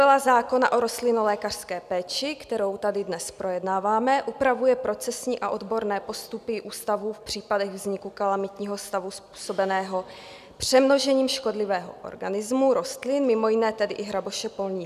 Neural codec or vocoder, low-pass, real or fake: none; 14.4 kHz; real